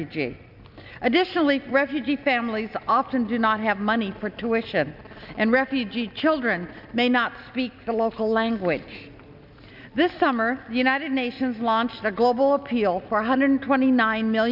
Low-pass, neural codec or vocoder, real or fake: 5.4 kHz; none; real